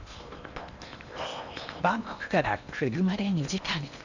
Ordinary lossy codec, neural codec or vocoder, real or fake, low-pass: none; codec, 16 kHz in and 24 kHz out, 0.8 kbps, FocalCodec, streaming, 65536 codes; fake; 7.2 kHz